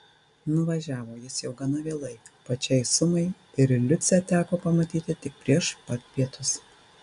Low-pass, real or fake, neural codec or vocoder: 10.8 kHz; real; none